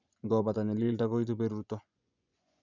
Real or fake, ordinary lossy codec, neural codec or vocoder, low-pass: fake; none; vocoder, 24 kHz, 100 mel bands, Vocos; 7.2 kHz